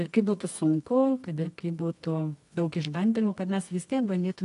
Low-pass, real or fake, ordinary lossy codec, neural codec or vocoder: 10.8 kHz; fake; AAC, 64 kbps; codec, 24 kHz, 0.9 kbps, WavTokenizer, medium music audio release